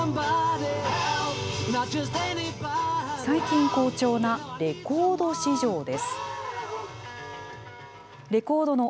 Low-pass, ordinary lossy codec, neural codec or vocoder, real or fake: none; none; none; real